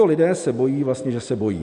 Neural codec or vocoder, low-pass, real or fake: none; 10.8 kHz; real